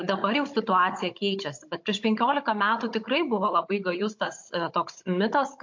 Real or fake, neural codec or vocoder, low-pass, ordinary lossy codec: fake; codec, 16 kHz, 16 kbps, FunCodec, trained on Chinese and English, 50 frames a second; 7.2 kHz; MP3, 48 kbps